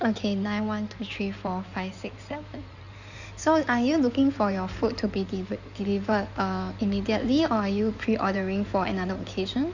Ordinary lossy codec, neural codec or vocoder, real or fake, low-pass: none; codec, 16 kHz in and 24 kHz out, 2.2 kbps, FireRedTTS-2 codec; fake; 7.2 kHz